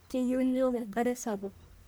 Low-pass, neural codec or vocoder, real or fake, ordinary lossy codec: none; codec, 44.1 kHz, 1.7 kbps, Pupu-Codec; fake; none